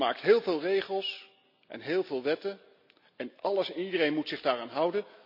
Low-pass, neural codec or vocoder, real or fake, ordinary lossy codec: 5.4 kHz; none; real; none